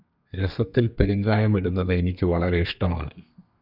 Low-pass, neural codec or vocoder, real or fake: 5.4 kHz; codec, 32 kHz, 1.9 kbps, SNAC; fake